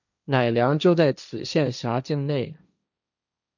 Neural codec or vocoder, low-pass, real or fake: codec, 16 kHz, 1.1 kbps, Voila-Tokenizer; 7.2 kHz; fake